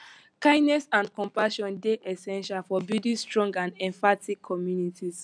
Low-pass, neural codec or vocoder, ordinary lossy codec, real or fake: 9.9 kHz; none; none; real